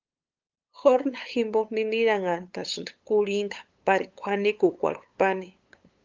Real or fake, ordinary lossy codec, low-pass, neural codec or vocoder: fake; Opus, 24 kbps; 7.2 kHz; codec, 16 kHz, 8 kbps, FunCodec, trained on LibriTTS, 25 frames a second